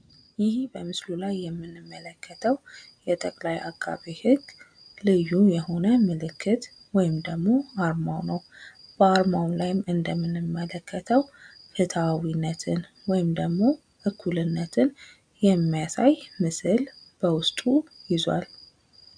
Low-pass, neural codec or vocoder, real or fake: 9.9 kHz; none; real